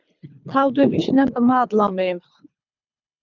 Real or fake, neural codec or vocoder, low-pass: fake; codec, 24 kHz, 3 kbps, HILCodec; 7.2 kHz